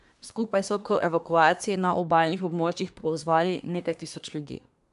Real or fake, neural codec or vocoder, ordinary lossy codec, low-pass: fake; codec, 24 kHz, 1 kbps, SNAC; none; 10.8 kHz